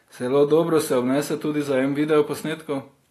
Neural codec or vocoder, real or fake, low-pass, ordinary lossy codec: none; real; 14.4 kHz; AAC, 48 kbps